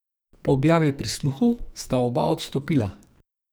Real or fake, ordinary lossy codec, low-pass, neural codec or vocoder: fake; none; none; codec, 44.1 kHz, 2.6 kbps, SNAC